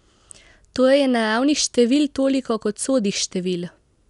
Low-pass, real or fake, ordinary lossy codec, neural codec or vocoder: 10.8 kHz; real; none; none